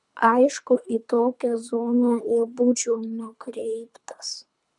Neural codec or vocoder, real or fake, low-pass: codec, 24 kHz, 3 kbps, HILCodec; fake; 10.8 kHz